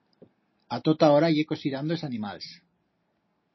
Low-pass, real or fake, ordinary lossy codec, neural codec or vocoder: 7.2 kHz; real; MP3, 24 kbps; none